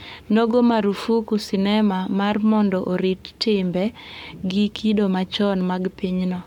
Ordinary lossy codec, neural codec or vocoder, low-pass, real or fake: none; codec, 44.1 kHz, 7.8 kbps, Pupu-Codec; 19.8 kHz; fake